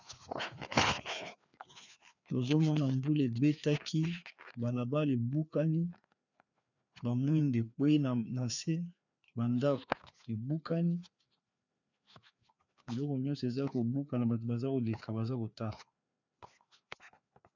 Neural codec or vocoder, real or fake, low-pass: codec, 16 kHz, 2 kbps, FreqCodec, larger model; fake; 7.2 kHz